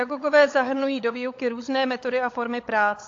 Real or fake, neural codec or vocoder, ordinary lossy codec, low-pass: fake; codec, 16 kHz, 16 kbps, FunCodec, trained on LibriTTS, 50 frames a second; AAC, 48 kbps; 7.2 kHz